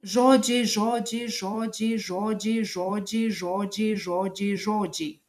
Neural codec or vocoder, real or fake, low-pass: vocoder, 44.1 kHz, 128 mel bands every 256 samples, BigVGAN v2; fake; 14.4 kHz